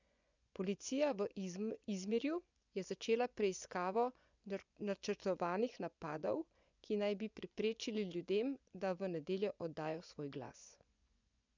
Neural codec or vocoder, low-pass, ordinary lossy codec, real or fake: vocoder, 24 kHz, 100 mel bands, Vocos; 7.2 kHz; none; fake